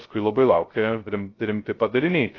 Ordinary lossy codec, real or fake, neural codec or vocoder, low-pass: AAC, 32 kbps; fake; codec, 16 kHz, 0.3 kbps, FocalCodec; 7.2 kHz